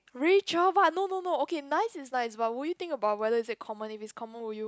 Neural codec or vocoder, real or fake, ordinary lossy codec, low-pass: none; real; none; none